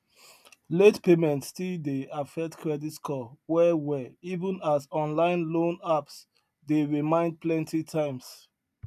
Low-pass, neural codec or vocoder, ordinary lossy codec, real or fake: 14.4 kHz; none; none; real